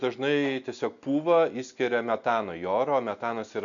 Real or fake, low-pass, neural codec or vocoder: real; 7.2 kHz; none